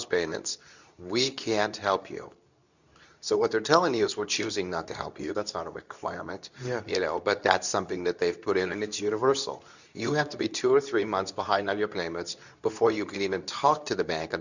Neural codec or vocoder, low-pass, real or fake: codec, 24 kHz, 0.9 kbps, WavTokenizer, medium speech release version 2; 7.2 kHz; fake